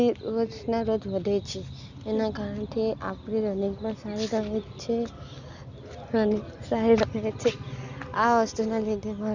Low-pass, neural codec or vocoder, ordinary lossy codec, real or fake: 7.2 kHz; none; none; real